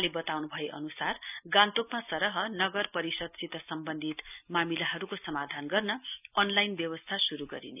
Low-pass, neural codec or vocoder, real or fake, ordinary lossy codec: 3.6 kHz; none; real; none